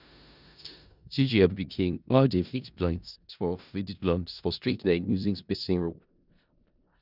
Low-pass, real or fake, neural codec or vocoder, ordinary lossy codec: 5.4 kHz; fake; codec, 16 kHz in and 24 kHz out, 0.4 kbps, LongCat-Audio-Codec, four codebook decoder; none